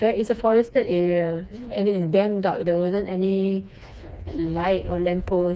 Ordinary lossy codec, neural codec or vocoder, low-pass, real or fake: none; codec, 16 kHz, 2 kbps, FreqCodec, smaller model; none; fake